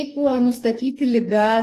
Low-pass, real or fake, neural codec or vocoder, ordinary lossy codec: 14.4 kHz; fake; codec, 44.1 kHz, 2.6 kbps, DAC; AAC, 48 kbps